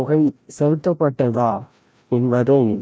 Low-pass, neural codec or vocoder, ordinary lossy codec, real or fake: none; codec, 16 kHz, 0.5 kbps, FreqCodec, larger model; none; fake